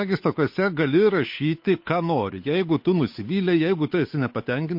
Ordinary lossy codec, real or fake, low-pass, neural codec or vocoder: MP3, 32 kbps; fake; 5.4 kHz; codec, 16 kHz, 8 kbps, FunCodec, trained on Chinese and English, 25 frames a second